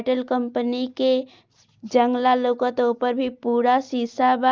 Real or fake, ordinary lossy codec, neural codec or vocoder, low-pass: real; Opus, 24 kbps; none; 7.2 kHz